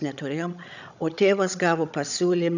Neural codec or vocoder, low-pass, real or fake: codec, 16 kHz, 16 kbps, FreqCodec, larger model; 7.2 kHz; fake